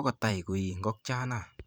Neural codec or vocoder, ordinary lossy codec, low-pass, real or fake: vocoder, 44.1 kHz, 128 mel bands every 512 samples, BigVGAN v2; none; none; fake